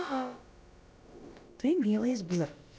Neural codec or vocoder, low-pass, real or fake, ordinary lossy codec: codec, 16 kHz, about 1 kbps, DyCAST, with the encoder's durations; none; fake; none